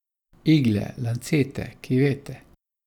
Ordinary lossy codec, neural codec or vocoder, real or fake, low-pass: none; none; real; 19.8 kHz